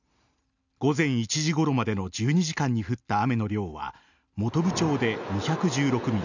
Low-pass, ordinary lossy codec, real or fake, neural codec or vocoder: 7.2 kHz; none; real; none